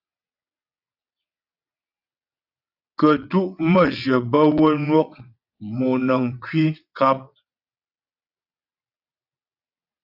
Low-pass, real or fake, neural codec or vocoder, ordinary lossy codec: 5.4 kHz; fake; vocoder, 22.05 kHz, 80 mel bands, WaveNeXt; AAC, 48 kbps